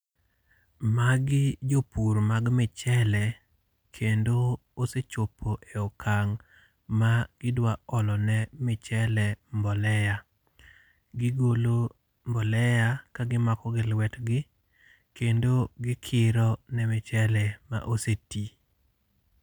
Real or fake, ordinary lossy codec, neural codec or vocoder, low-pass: real; none; none; none